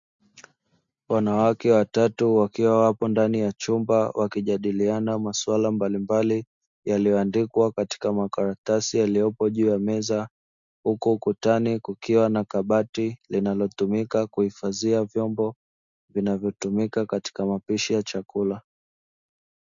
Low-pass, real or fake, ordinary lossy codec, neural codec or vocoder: 7.2 kHz; real; MP3, 64 kbps; none